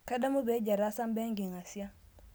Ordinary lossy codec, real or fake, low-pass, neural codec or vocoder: none; real; none; none